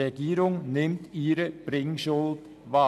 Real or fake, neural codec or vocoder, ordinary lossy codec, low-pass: real; none; none; 14.4 kHz